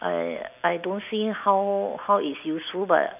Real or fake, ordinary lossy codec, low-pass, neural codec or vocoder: real; none; 3.6 kHz; none